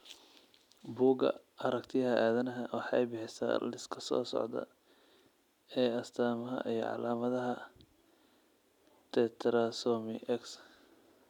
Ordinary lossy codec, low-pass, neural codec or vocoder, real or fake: none; 19.8 kHz; none; real